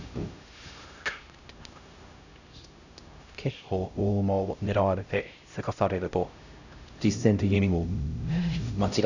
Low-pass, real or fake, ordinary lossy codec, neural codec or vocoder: 7.2 kHz; fake; none; codec, 16 kHz, 0.5 kbps, X-Codec, HuBERT features, trained on LibriSpeech